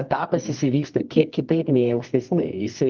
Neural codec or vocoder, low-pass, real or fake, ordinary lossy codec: codec, 24 kHz, 0.9 kbps, WavTokenizer, medium music audio release; 7.2 kHz; fake; Opus, 24 kbps